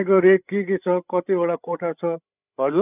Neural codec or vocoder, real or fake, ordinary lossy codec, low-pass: codec, 16 kHz, 8 kbps, FreqCodec, larger model; fake; none; 3.6 kHz